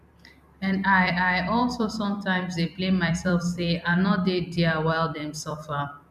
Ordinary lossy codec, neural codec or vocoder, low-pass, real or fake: none; vocoder, 44.1 kHz, 128 mel bands every 256 samples, BigVGAN v2; 14.4 kHz; fake